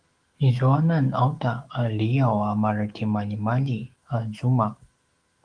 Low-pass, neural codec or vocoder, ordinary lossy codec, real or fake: 9.9 kHz; autoencoder, 48 kHz, 128 numbers a frame, DAC-VAE, trained on Japanese speech; Opus, 24 kbps; fake